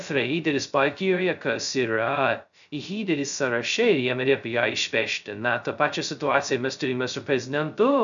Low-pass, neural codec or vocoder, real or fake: 7.2 kHz; codec, 16 kHz, 0.2 kbps, FocalCodec; fake